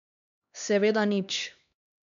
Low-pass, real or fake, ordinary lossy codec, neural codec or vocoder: 7.2 kHz; fake; none; codec, 16 kHz, 2 kbps, X-Codec, HuBERT features, trained on LibriSpeech